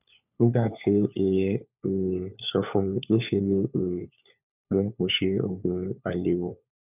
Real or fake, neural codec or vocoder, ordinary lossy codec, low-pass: fake; codec, 16 kHz, 4 kbps, FunCodec, trained on LibriTTS, 50 frames a second; none; 3.6 kHz